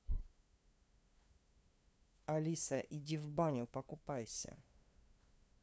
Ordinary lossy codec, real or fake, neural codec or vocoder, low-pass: none; fake; codec, 16 kHz, 2 kbps, FunCodec, trained on LibriTTS, 25 frames a second; none